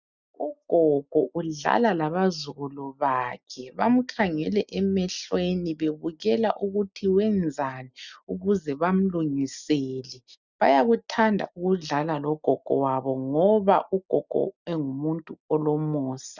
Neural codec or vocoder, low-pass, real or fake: none; 7.2 kHz; real